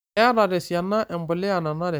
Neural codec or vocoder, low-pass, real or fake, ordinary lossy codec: none; none; real; none